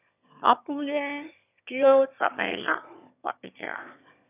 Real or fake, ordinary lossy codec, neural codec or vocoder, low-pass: fake; none; autoencoder, 22.05 kHz, a latent of 192 numbers a frame, VITS, trained on one speaker; 3.6 kHz